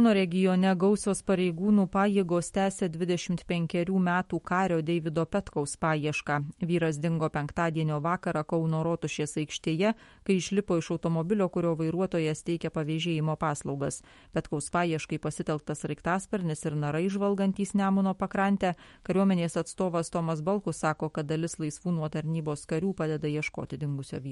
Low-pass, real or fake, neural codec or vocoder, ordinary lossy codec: 19.8 kHz; fake; autoencoder, 48 kHz, 128 numbers a frame, DAC-VAE, trained on Japanese speech; MP3, 48 kbps